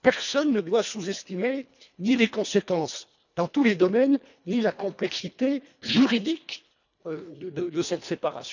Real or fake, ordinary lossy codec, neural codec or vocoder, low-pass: fake; none; codec, 24 kHz, 1.5 kbps, HILCodec; 7.2 kHz